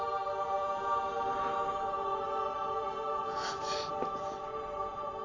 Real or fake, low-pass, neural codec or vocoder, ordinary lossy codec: real; 7.2 kHz; none; AAC, 32 kbps